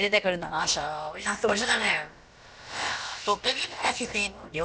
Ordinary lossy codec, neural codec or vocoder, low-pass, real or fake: none; codec, 16 kHz, about 1 kbps, DyCAST, with the encoder's durations; none; fake